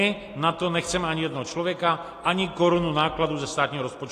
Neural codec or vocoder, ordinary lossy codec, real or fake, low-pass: none; AAC, 48 kbps; real; 14.4 kHz